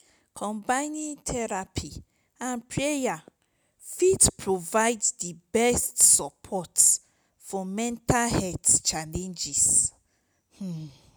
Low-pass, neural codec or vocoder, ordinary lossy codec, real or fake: none; none; none; real